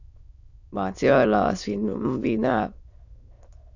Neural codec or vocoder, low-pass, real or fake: autoencoder, 22.05 kHz, a latent of 192 numbers a frame, VITS, trained on many speakers; 7.2 kHz; fake